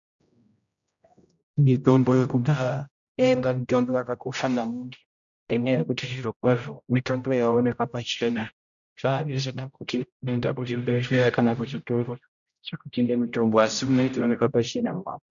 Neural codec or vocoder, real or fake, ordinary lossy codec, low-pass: codec, 16 kHz, 0.5 kbps, X-Codec, HuBERT features, trained on general audio; fake; MP3, 96 kbps; 7.2 kHz